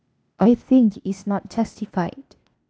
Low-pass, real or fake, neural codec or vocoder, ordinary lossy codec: none; fake; codec, 16 kHz, 0.8 kbps, ZipCodec; none